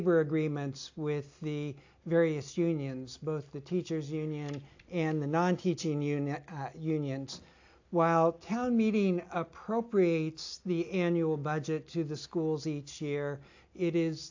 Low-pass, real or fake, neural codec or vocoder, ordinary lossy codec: 7.2 kHz; real; none; AAC, 48 kbps